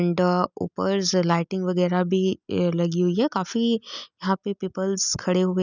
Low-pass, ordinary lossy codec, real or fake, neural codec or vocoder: 7.2 kHz; none; real; none